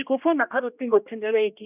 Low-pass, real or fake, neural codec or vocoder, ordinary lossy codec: 3.6 kHz; fake; codec, 16 kHz, 1 kbps, X-Codec, HuBERT features, trained on general audio; none